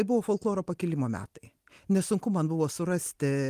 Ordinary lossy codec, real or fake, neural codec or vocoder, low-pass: Opus, 24 kbps; real; none; 14.4 kHz